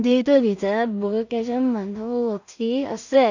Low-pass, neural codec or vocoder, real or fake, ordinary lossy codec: 7.2 kHz; codec, 16 kHz in and 24 kHz out, 0.4 kbps, LongCat-Audio-Codec, two codebook decoder; fake; none